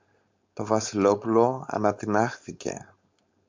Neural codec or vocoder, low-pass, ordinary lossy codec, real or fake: codec, 16 kHz, 4.8 kbps, FACodec; 7.2 kHz; MP3, 64 kbps; fake